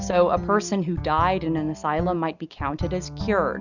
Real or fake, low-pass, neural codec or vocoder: real; 7.2 kHz; none